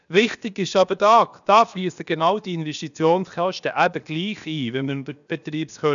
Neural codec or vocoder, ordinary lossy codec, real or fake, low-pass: codec, 16 kHz, about 1 kbps, DyCAST, with the encoder's durations; none; fake; 7.2 kHz